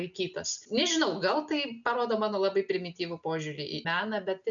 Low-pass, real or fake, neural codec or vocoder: 7.2 kHz; real; none